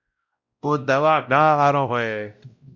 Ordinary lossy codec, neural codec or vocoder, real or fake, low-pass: Opus, 64 kbps; codec, 16 kHz, 0.5 kbps, X-Codec, WavLM features, trained on Multilingual LibriSpeech; fake; 7.2 kHz